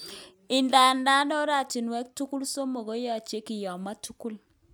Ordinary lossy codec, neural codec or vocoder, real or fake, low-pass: none; none; real; none